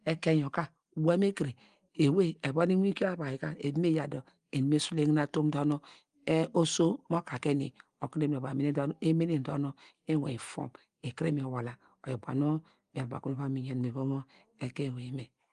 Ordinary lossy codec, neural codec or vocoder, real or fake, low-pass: Opus, 24 kbps; none; real; 9.9 kHz